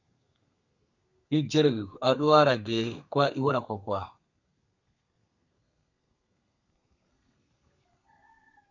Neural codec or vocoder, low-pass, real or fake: codec, 32 kHz, 1.9 kbps, SNAC; 7.2 kHz; fake